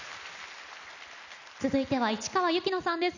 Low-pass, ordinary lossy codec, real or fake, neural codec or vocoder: 7.2 kHz; none; real; none